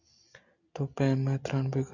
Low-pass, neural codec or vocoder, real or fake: 7.2 kHz; none; real